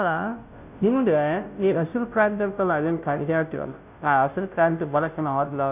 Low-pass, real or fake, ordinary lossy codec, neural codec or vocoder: 3.6 kHz; fake; none; codec, 16 kHz, 0.5 kbps, FunCodec, trained on Chinese and English, 25 frames a second